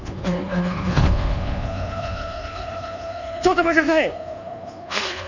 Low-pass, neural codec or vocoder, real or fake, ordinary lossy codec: 7.2 kHz; codec, 24 kHz, 1.2 kbps, DualCodec; fake; none